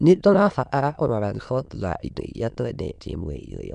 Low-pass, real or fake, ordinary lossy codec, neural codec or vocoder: 9.9 kHz; fake; none; autoencoder, 22.05 kHz, a latent of 192 numbers a frame, VITS, trained on many speakers